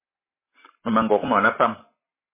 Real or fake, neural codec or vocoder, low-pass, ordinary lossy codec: real; none; 3.6 kHz; MP3, 16 kbps